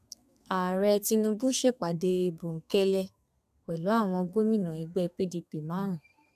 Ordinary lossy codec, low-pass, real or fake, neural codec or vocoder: none; 14.4 kHz; fake; codec, 32 kHz, 1.9 kbps, SNAC